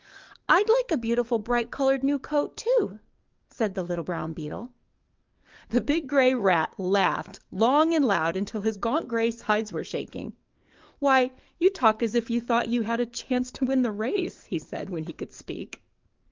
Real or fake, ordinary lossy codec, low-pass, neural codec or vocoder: fake; Opus, 24 kbps; 7.2 kHz; codec, 44.1 kHz, 7.8 kbps, DAC